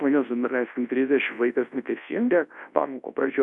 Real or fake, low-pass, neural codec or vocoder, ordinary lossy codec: fake; 10.8 kHz; codec, 24 kHz, 0.9 kbps, WavTokenizer, large speech release; AAC, 64 kbps